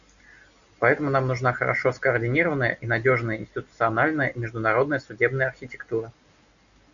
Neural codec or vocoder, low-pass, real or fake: none; 7.2 kHz; real